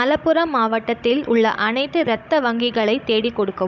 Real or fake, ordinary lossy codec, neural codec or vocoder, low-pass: fake; none; codec, 16 kHz, 16 kbps, FunCodec, trained on Chinese and English, 50 frames a second; none